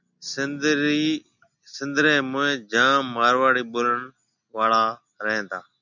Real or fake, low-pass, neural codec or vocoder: real; 7.2 kHz; none